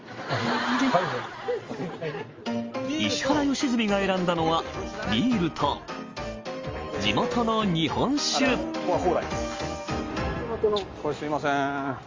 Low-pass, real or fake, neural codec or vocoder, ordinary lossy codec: 7.2 kHz; real; none; Opus, 32 kbps